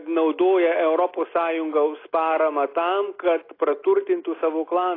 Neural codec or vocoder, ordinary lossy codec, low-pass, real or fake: none; AAC, 24 kbps; 5.4 kHz; real